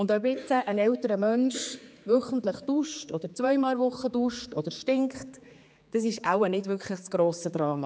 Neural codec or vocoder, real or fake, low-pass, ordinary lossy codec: codec, 16 kHz, 4 kbps, X-Codec, HuBERT features, trained on general audio; fake; none; none